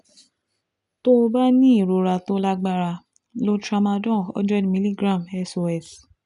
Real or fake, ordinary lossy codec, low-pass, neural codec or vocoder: real; none; 10.8 kHz; none